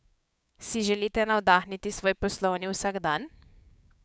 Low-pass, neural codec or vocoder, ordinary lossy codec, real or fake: none; codec, 16 kHz, 6 kbps, DAC; none; fake